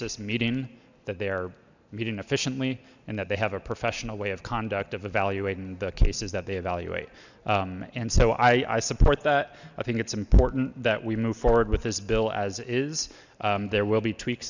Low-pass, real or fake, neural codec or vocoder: 7.2 kHz; real; none